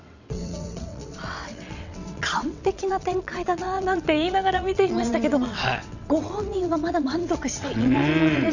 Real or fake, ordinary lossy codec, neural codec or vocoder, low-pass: fake; none; vocoder, 22.05 kHz, 80 mel bands, WaveNeXt; 7.2 kHz